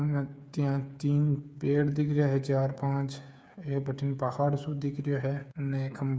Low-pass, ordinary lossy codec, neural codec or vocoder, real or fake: none; none; codec, 16 kHz, 8 kbps, FreqCodec, smaller model; fake